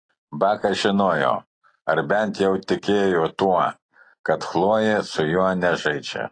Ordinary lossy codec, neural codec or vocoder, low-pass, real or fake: AAC, 32 kbps; none; 9.9 kHz; real